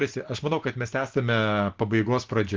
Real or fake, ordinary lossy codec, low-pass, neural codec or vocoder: real; Opus, 16 kbps; 7.2 kHz; none